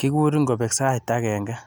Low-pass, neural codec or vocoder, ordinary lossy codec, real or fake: none; none; none; real